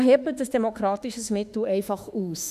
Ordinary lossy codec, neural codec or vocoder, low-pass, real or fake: none; autoencoder, 48 kHz, 32 numbers a frame, DAC-VAE, trained on Japanese speech; 14.4 kHz; fake